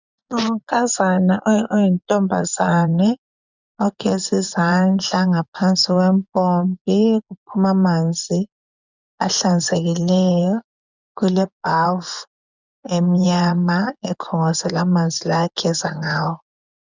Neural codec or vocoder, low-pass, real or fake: vocoder, 24 kHz, 100 mel bands, Vocos; 7.2 kHz; fake